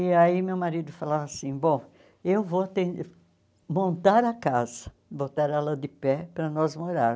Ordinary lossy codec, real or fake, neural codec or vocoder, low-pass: none; real; none; none